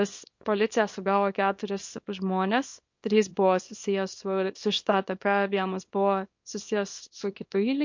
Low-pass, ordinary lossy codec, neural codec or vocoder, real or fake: 7.2 kHz; MP3, 48 kbps; codec, 24 kHz, 0.9 kbps, WavTokenizer, small release; fake